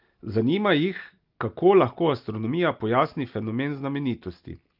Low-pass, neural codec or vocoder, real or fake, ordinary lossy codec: 5.4 kHz; none; real; Opus, 32 kbps